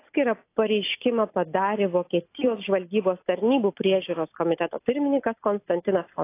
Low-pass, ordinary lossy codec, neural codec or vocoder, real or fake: 3.6 kHz; AAC, 24 kbps; none; real